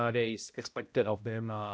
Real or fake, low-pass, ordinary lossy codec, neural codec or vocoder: fake; none; none; codec, 16 kHz, 0.5 kbps, X-Codec, HuBERT features, trained on balanced general audio